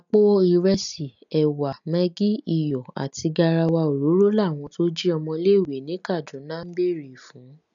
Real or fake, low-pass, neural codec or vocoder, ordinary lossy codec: real; 7.2 kHz; none; none